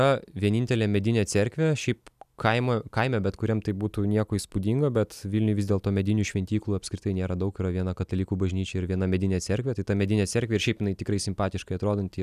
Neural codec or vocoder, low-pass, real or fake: none; 14.4 kHz; real